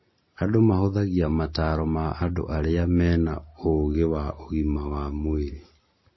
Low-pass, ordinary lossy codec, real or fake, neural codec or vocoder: 7.2 kHz; MP3, 24 kbps; real; none